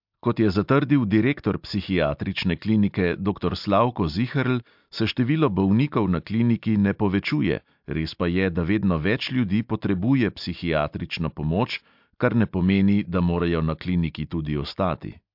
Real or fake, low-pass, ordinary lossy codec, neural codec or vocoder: real; 5.4 kHz; MP3, 48 kbps; none